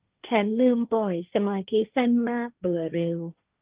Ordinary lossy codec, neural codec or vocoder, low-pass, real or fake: Opus, 32 kbps; codec, 16 kHz, 1.1 kbps, Voila-Tokenizer; 3.6 kHz; fake